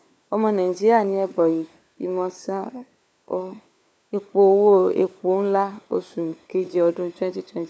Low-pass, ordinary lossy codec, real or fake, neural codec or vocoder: none; none; fake; codec, 16 kHz, 4 kbps, FunCodec, trained on LibriTTS, 50 frames a second